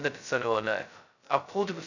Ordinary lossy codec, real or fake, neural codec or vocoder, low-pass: none; fake; codec, 16 kHz, 0.2 kbps, FocalCodec; 7.2 kHz